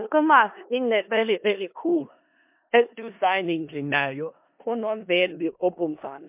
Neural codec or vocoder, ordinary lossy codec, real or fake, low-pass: codec, 16 kHz in and 24 kHz out, 0.4 kbps, LongCat-Audio-Codec, four codebook decoder; MP3, 32 kbps; fake; 3.6 kHz